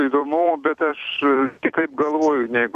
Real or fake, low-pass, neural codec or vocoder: fake; 14.4 kHz; vocoder, 44.1 kHz, 128 mel bands every 256 samples, BigVGAN v2